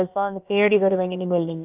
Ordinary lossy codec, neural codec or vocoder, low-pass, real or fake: none; codec, 16 kHz, about 1 kbps, DyCAST, with the encoder's durations; 3.6 kHz; fake